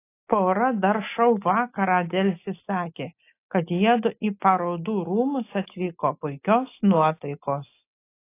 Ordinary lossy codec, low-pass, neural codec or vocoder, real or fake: AAC, 24 kbps; 3.6 kHz; none; real